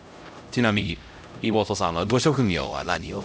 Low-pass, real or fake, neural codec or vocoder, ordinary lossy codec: none; fake; codec, 16 kHz, 0.5 kbps, X-Codec, HuBERT features, trained on LibriSpeech; none